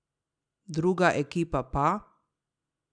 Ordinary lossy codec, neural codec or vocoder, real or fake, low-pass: none; none; real; 9.9 kHz